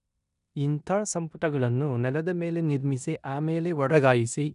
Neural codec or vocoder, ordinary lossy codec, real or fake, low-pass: codec, 16 kHz in and 24 kHz out, 0.9 kbps, LongCat-Audio-Codec, four codebook decoder; none; fake; 10.8 kHz